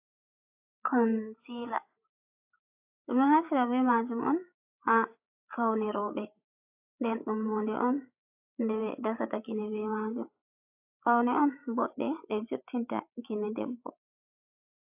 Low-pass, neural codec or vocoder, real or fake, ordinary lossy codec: 3.6 kHz; codec, 16 kHz, 8 kbps, FreqCodec, larger model; fake; AAC, 32 kbps